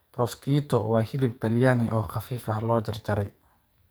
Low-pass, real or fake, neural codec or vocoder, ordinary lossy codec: none; fake; codec, 44.1 kHz, 2.6 kbps, SNAC; none